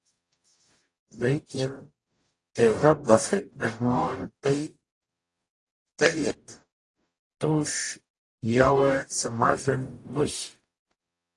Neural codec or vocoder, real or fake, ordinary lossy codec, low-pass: codec, 44.1 kHz, 0.9 kbps, DAC; fake; AAC, 48 kbps; 10.8 kHz